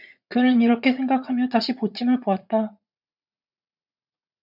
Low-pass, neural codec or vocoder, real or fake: 5.4 kHz; vocoder, 44.1 kHz, 80 mel bands, Vocos; fake